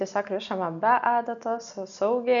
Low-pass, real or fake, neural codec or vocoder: 7.2 kHz; real; none